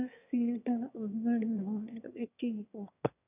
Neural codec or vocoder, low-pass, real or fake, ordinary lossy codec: autoencoder, 22.05 kHz, a latent of 192 numbers a frame, VITS, trained on one speaker; 3.6 kHz; fake; none